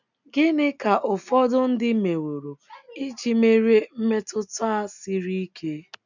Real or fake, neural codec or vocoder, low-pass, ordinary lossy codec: real; none; 7.2 kHz; none